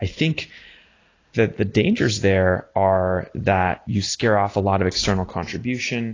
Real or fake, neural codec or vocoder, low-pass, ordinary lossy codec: real; none; 7.2 kHz; AAC, 32 kbps